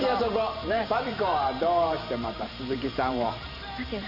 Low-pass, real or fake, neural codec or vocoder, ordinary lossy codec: 5.4 kHz; fake; vocoder, 44.1 kHz, 128 mel bands every 512 samples, BigVGAN v2; none